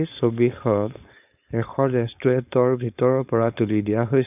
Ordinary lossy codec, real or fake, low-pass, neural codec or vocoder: none; fake; 3.6 kHz; codec, 16 kHz, 4.8 kbps, FACodec